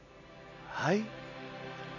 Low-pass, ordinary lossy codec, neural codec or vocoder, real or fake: 7.2 kHz; none; none; real